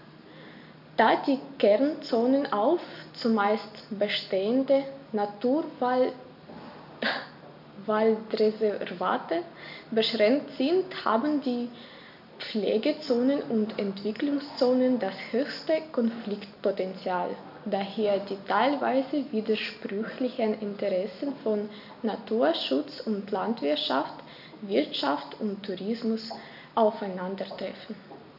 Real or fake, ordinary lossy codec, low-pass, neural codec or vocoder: real; AAC, 48 kbps; 5.4 kHz; none